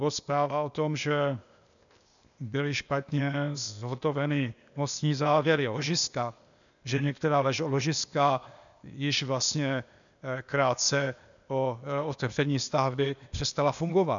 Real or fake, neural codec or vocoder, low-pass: fake; codec, 16 kHz, 0.8 kbps, ZipCodec; 7.2 kHz